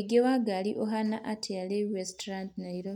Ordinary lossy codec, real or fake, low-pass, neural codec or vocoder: none; real; 19.8 kHz; none